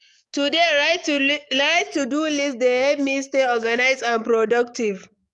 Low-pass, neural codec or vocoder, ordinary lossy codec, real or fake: 10.8 kHz; codec, 44.1 kHz, 7.8 kbps, DAC; none; fake